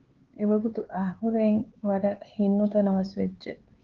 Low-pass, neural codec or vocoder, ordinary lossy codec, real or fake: 7.2 kHz; codec, 16 kHz, 4 kbps, X-Codec, HuBERT features, trained on LibriSpeech; Opus, 16 kbps; fake